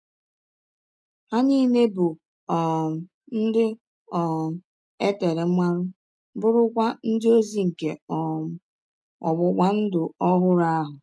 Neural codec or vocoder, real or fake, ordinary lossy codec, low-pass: none; real; none; none